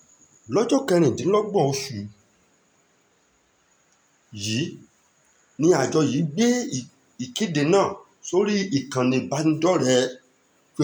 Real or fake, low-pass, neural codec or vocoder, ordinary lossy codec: real; none; none; none